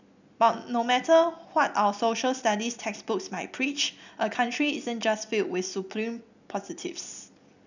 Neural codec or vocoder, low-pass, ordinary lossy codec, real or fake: none; 7.2 kHz; none; real